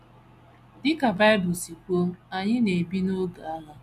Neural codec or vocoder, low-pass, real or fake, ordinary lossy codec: none; 14.4 kHz; real; none